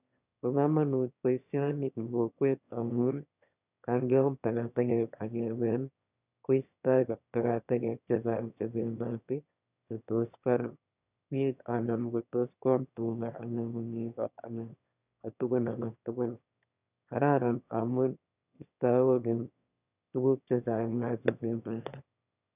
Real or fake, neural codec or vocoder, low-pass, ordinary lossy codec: fake; autoencoder, 22.05 kHz, a latent of 192 numbers a frame, VITS, trained on one speaker; 3.6 kHz; none